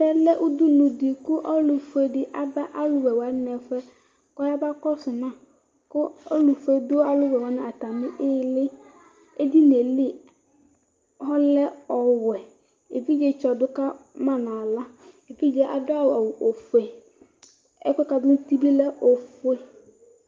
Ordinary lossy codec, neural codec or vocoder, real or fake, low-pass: Opus, 32 kbps; none; real; 7.2 kHz